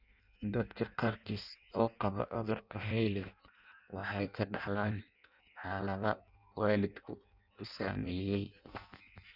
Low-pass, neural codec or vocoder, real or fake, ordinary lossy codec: 5.4 kHz; codec, 16 kHz in and 24 kHz out, 0.6 kbps, FireRedTTS-2 codec; fake; none